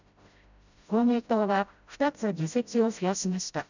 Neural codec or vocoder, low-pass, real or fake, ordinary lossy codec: codec, 16 kHz, 0.5 kbps, FreqCodec, smaller model; 7.2 kHz; fake; none